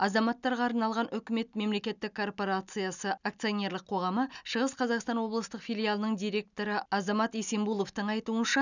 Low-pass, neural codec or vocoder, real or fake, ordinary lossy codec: 7.2 kHz; none; real; none